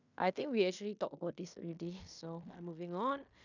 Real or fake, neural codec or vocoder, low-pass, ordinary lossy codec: fake; codec, 16 kHz in and 24 kHz out, 0.9 kbps, LongCat-Audio-Codec, fine tuned four codebook decoder; 7.2 kHz; none